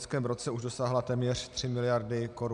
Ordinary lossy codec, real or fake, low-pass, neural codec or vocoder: MP3, 96 kbps; real; 10.8 kHz; none